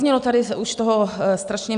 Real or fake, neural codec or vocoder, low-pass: real; none; 9.9 kHz